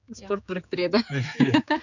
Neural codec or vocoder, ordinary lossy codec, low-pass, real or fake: codec, 16 kHz, 4 kbps, X-Codec, HuBERT features, trained on general audio; none; 7.2 kHz; fake